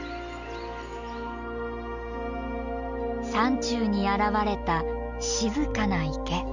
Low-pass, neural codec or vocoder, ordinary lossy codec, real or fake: 7.2 kHz; none; none; real